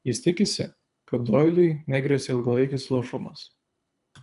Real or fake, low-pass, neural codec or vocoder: fake; 10.8 kHz; codec, 24 kHz, 3 kbps, HILCodec